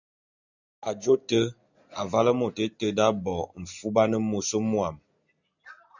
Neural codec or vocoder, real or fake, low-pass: none; real; 7.2 kHz